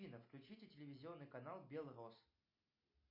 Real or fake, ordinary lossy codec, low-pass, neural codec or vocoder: real; MP3, 32 kbps; 5.4 kHz; none